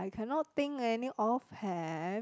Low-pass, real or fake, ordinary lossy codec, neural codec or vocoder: none; real; none; none